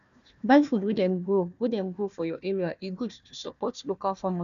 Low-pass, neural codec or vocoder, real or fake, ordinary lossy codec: 7.2 kHz; codec, 16 kHz, 1 kbps, FunCodec, trained on Chinese and English, 50 frames a second; fake; none